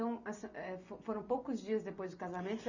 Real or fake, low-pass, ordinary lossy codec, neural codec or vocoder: real; 7.2 kHz; none; none